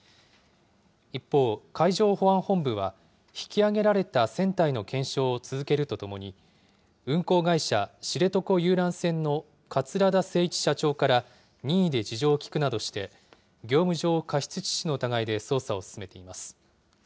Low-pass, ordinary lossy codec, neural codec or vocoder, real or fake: none; none; none; real